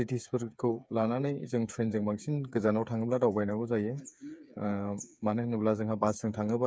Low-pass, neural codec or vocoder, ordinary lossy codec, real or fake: none; codec, 16 kHz, 8 kbps, FreqCodec, smaller model; none; fake